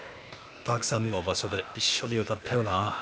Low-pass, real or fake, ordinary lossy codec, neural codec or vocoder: none; fake; none; codec, 16 kHz, 0.8 kbps, ZipCodec